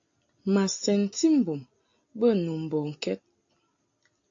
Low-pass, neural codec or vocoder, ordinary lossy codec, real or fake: 7.2 kHz; none; AAC, 48 kbps; real